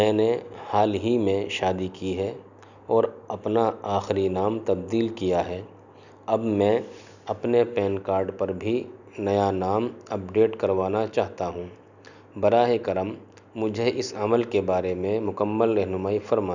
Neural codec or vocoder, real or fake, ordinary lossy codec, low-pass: none; real; none; 7.2 kHz